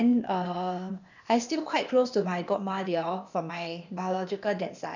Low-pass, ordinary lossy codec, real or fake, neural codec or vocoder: 7.2 kHz; none; fake; codec, 16 kHz, 0.8 kbps, ZipCodec